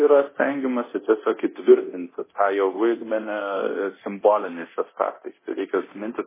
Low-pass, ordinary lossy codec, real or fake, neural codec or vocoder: 3.6 kHz; MP3, 16 kbps; fake; codec, 24 kHz, 0.9 kbps, DualCodec